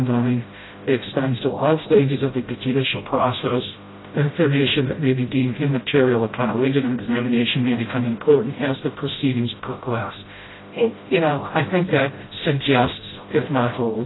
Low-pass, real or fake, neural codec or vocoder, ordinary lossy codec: 7.2 kHz; fake; codec, 16 kHz, 0.5 kbps, FreqCodec, smaller model; AAC, 16 kbps